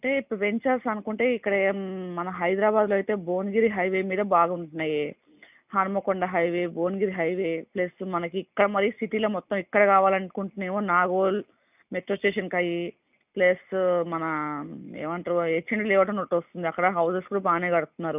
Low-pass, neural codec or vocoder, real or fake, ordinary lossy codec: 3.6 kHz; none; real; none